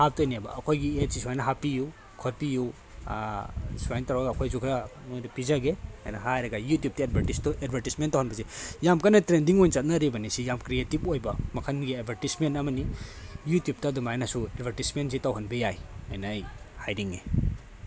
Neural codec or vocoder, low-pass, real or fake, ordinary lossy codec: none; none; real; none